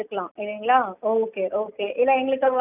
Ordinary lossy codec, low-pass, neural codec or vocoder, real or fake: none; 3.6 kHz; none; real